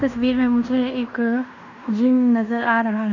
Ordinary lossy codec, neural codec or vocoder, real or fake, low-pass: none; codec, 16 kHz in and 24 kHz out, 0.9 kbps, LongCat-Audio-Codec, fine tuned four codebook decoder; fake; 7.2 kHz